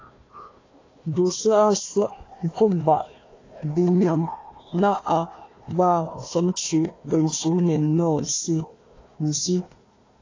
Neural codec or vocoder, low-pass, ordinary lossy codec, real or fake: codec, 16 kHz, 1 kbps, FunCodec, trained on Chinese and English, 50 frames a second; 7.2 kHz; AAC, 32 kbps; fake